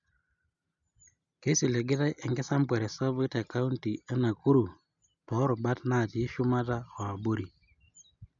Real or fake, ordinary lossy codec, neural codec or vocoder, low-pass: real; none; none; 7.2 kHz